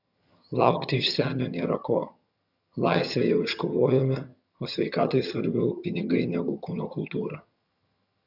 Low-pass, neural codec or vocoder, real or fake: 5.4 kHz; vocoder, 22.05 kHz, 80 mel bands, HiFi-GAN; fake